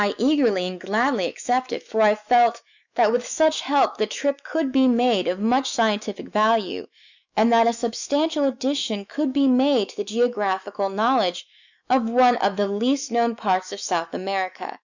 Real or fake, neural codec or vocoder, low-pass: fake; autoencoder, 48 kHz, 128 numbers a frame, DAC-VAE, trained on Japanese speech; 7.2 kHz